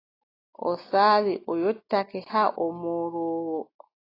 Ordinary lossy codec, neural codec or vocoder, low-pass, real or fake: AAC, 24 kbps; none; 5.4 kHz; real